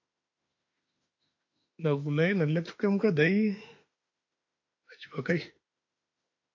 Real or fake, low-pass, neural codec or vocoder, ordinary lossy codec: fake; 7.2 kHz; autoencoder, 48 kHz, 32 numbers a frame, DAC-VAE, trained on Japanese speech; AAC, 48 kbps